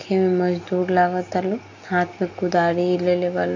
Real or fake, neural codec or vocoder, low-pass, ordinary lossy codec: real; none; 7.2 kHz; AAC, 48 kbps